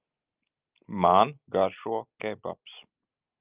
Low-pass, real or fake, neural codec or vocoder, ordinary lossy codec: 3.6 kHz; real; none; Opus, 24 kbps